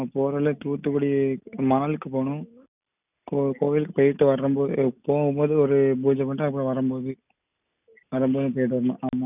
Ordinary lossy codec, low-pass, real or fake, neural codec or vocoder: none; 3.6 kHz; real; none